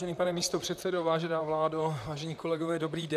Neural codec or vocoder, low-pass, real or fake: vocoder, 44.1 kHz, 128 mel bands, Pupu-Vocoder; 14.4 kHz; fake